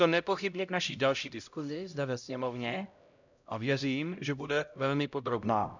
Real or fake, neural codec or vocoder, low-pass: fake; codec, 16 kHz, 0.5 kbps, X-Codec, HuBERT features, trained on LibriSpeech; 7.2 kHz